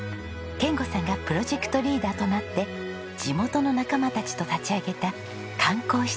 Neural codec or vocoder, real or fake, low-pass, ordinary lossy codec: none; real; none; none